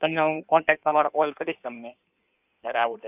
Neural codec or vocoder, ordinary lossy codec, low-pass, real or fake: codec, 16 kHz in and 24 kHz out, 1.1 kbps, FireRedTTS-2 codec; none; 3.6 kHz; fake